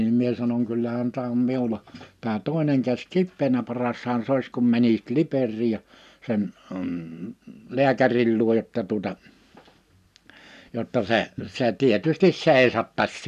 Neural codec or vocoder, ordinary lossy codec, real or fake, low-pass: none; none; real; 14.4 kHz